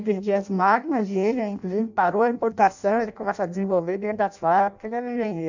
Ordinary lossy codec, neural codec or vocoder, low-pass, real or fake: none; codec, 16 kHz in and 24 kHz out, 0.6 kbps, FireRedTTS-2 codec; 7.2 kHz; fake